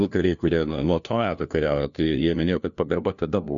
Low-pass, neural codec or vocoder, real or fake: 7.2 kHz; codec, 16 kHz, 1 kbps, FunCodec, trained on LibriTTS, 50 frames a second; fake